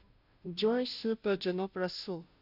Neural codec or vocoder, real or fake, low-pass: codec, 16 kHz, 0.5 kbps, FunCodec, trained on Chinese and English, 25 frames a second; fake; 5.4 kHz